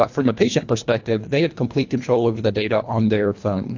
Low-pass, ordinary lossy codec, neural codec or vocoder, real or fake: 7.2 kHz; AAC, 48 kbps; codec, 24 kHz, 1.5 kbps, HILCodec; fake